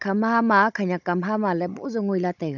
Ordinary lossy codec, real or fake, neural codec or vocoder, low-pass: none; fake; codec, 16 kHz, 16 kbps, FunCodec, trained on Chinese and English, 50 frames a second; 7.2 kHz